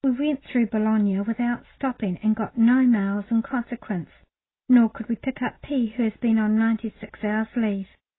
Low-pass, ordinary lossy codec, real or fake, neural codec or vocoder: 7.2 kHz; AAC, 16 kbps; real; none